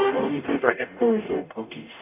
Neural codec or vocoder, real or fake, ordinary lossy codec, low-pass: codec, 44.1 kHz, 0.9 kbps, DAC; fake; none; 3.6 kHz